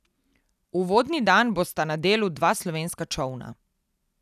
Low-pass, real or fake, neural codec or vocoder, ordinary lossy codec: 14.4 kHz; real; none; none